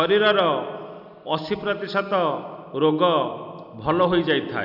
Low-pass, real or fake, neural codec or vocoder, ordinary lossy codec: 5.4 kHz; real; none; none